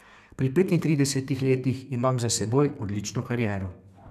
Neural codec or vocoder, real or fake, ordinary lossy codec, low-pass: codec, 32 kHz, 1.9 kbps, SNAC; fake; none; 14.4 kHz